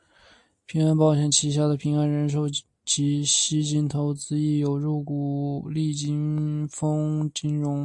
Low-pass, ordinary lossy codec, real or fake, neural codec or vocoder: 10.8 kHz; AAC, 64 kbps; real; none